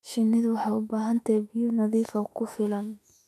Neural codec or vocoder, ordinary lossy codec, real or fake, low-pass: autoencoder, 48 kHz, 32 numbers a frame, DAC-VAE, trained on Japanese speech; none; fake; 14.4 kHz